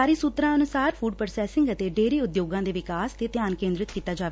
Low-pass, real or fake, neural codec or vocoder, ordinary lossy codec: none; real; none; none